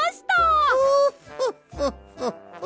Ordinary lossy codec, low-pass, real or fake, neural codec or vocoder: none; none; real; none